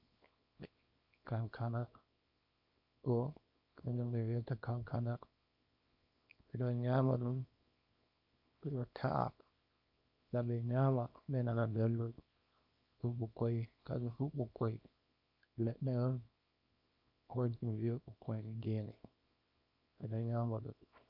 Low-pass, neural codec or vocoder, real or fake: 5.4 kHz; codec, 24 kHz, 0.9 kbps, WavTokenizer, small release; fake